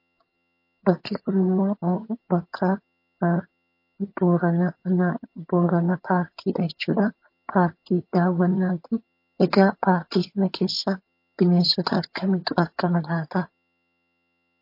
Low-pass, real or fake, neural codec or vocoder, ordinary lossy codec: 5.4 kHz; fake; vocoder, 22.05 kHz, 80 mel bands, HiFi-GAN; MP3, 32 kbps